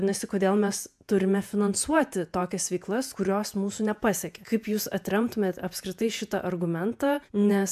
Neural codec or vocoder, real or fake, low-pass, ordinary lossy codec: vocoder, 48 kHz, 128 mel bands, Vocos; fake; 14.4 kHz; AAC, 96 kbps